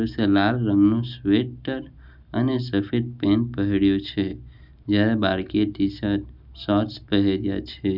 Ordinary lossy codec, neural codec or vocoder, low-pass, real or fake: none; none; 5.4 kHz; real